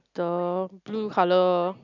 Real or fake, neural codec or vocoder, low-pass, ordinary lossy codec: real; none; 7.2 kHz; none